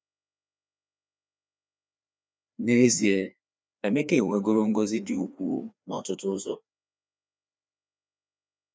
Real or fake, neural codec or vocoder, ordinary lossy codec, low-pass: fake; codec, 16 kHz, 2 kbps, FreqCodec, larger model; none; none